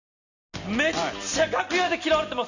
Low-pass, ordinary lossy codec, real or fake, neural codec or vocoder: 7.2 kHz; none; real; none